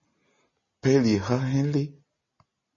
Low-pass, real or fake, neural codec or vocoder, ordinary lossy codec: 7.2 kHz; real; none; MP3, 32 kbps